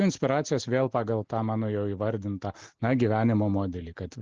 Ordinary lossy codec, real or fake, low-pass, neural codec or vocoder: Opus, 16 kbps; real; 7.2 kHz; none